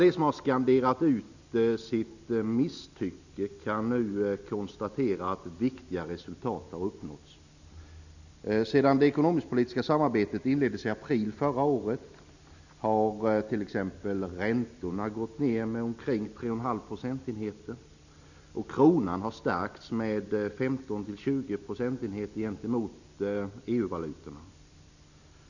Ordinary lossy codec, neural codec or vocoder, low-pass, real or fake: none; none; 7.2 kHz; real